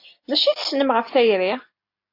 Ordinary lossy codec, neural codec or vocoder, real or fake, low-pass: AAC, 32 kbps; none; real; 5.4 kHz